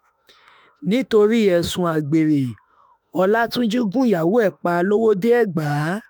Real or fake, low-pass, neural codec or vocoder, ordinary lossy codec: fake; none; autoencoder, 48 kHz, 32 numbers a frame, DAC-VAE, trained on Japanese speech; none